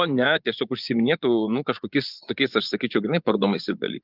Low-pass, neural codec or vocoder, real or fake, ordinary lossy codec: 5.4 kHz; vocoder, 24 kHz, 100 mel bands, Vocos; fake; Opus, 32 kbps